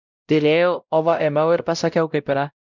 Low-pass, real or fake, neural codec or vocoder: 7.2 kHz; fake; codec, 16 kHz, 0.5 kbps, X-Codec, WavLM features, trained on Multilingual LibriSpeech